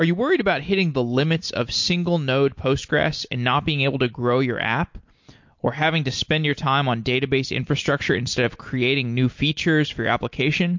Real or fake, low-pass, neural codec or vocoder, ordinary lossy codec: real; 7.2 kHz; none; MP3, 48 kbps